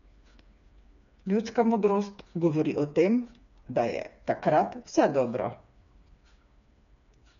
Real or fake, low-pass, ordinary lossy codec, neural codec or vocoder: fake; 7.2 kHz; none; codec, 16 kHz, 4 kbps, FreqCodec, smaller model